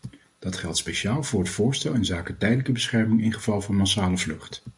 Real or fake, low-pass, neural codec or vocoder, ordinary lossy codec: real; 10.8 kHz; none; MP3, 64 kbps